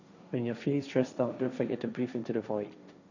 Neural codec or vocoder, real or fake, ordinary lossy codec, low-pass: codec, 16 kHz, 1.1 kbps, Voila-Tokenizer; fake; none; 7.2 kHz